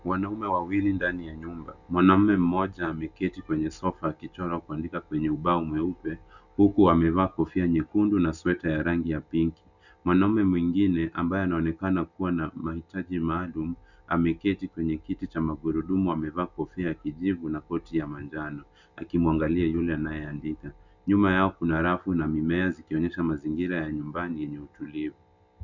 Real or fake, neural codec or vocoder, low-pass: real; none; 7.2 kHz